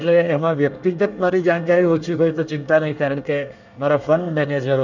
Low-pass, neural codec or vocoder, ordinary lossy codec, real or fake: 7.2 kHz; codec, 24 kHz, 1 kbps, SNAC; none; fake